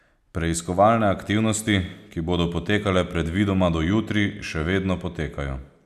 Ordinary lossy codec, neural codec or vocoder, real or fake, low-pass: none; none; real; 14.4 kHz